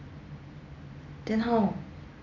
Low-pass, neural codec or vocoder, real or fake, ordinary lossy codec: 7.2 kHz; none; real; none